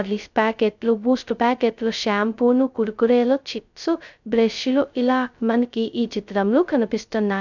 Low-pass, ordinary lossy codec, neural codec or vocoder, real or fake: 7.2 kHz; none; codec, 16 kHz, 0.2 kbps, FocalCodec; fake